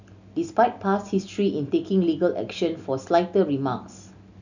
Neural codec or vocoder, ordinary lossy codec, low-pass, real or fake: none; none; 7.2 kHz; real